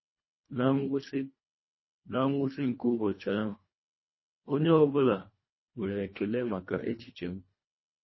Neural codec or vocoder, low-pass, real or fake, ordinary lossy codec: codec, 24 kHz, 1.5 kbps, HILCodec; 7.2 kHz; fake; MP3, 24 kbps